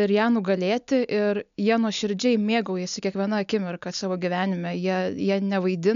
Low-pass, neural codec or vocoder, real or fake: 7.2 kHz; none; real